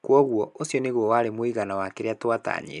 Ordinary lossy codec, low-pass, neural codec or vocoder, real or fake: none; 10.8 kHz; none; real